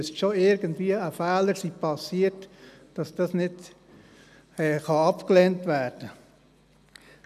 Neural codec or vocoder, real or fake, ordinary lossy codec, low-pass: none; real; none; 14.4 kHz